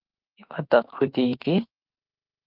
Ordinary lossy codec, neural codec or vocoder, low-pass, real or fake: Opus, 32 kbps; autoencoder, 48 kHz, 32 numbers a frame, DAC-VAE, trained on Japanese speech; 5.4 kHz; fake